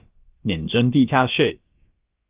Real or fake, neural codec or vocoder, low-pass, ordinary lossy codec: fake; codec, 16 kHz, about 1 kbps, DyCAST, with the encoder's durations; 3.6 kHz; Opus, 24 kbps